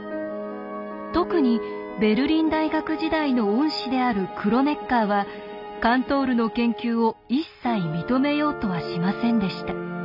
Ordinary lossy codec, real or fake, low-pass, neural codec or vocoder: none; real; 5.4 kHz; none